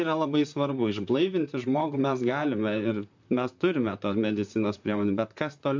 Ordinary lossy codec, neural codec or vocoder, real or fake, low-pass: MP3, 64 kbps; vocoder, 44.1 kHz, 128 mel bands, Pupu-Vocoder; fake; 7.2 kHz